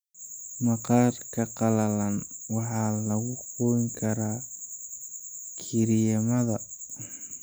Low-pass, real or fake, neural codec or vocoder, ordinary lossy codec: none; real; none; none